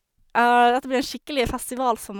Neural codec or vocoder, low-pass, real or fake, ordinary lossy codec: none; 19.8 kHz; real; none